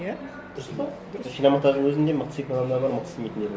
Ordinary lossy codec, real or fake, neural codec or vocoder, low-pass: none; real; none; none